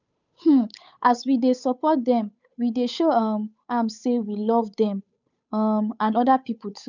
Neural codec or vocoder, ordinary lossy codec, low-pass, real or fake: codec, 16 kHz, 8 kbps, FunCodec, trained on Chinese and English, 25 frames a second; none; 7.2 kHz; fake